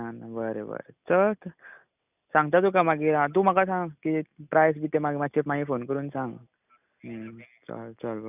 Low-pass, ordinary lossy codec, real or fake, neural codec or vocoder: 3.6 kHz; none; real; none